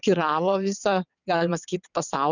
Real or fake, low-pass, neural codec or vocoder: fake; 7.2 kHz; vocoder, 22.05 kHz, 80 mel bands, WaveNeXt